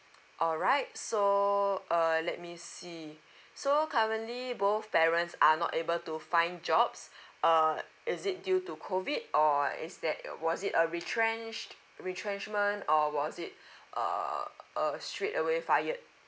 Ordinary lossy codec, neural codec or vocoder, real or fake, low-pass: none; none; real; none